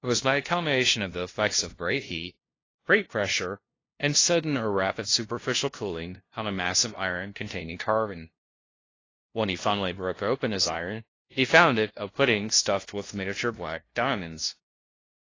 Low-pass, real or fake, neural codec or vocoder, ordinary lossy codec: 7.2 kHz; fake; codec, 16 kHz, 0.5 kbps, FunCodec, trained on LibriTTS, 25 frames a second; AAC, 32 kbps